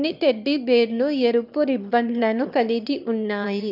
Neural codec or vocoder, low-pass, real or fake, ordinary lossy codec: autoencoder, 22.05 kHz, a latent of 192 numbers a frame, VITS, trained on one speaker; 5.4 kHz; fake; none